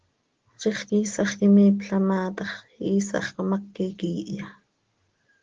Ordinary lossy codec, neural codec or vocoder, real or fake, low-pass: Opus, 16 kbps; none; real; 7.2 kHz